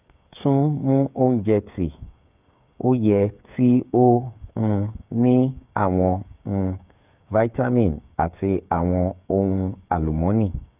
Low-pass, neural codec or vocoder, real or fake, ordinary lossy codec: 3.6 kHz; codec, 16 kHz, 8 kbps, FreqCodec, smaller model; fake; none